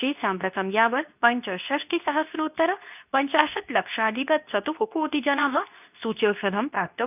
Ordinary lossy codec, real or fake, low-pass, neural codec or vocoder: none; fake; 3.6 kHz; codec, 24 kHz, 0.9 kbps, WavTokenizer, medium speech release version 2